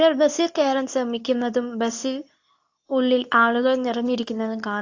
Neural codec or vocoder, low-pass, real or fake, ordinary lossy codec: codec, 24 kHz, 0.9 kbps, WavTokenizer, medium speech release version 2; 7.2 kHz; fake; AAC, 48 kbps